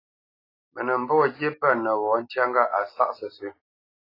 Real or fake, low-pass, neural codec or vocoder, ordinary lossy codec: real; 5.4 kHz; none; AAC, 24 kbps